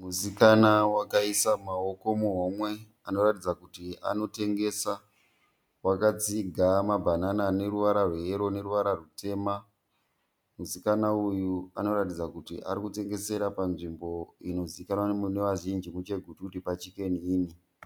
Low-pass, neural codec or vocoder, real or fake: 19.8 kHz; none; real